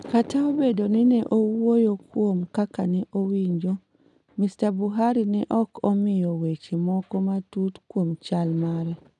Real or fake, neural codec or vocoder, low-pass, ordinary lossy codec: real; none; 10.8 kHz; none